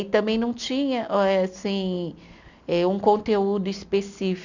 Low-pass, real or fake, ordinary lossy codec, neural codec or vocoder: 7.2 kHz; real; none; none